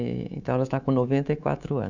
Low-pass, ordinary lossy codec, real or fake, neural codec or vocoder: 7.2 kHz; none; fake; codec, 24 kHz, 3.1 kbps, DualCodec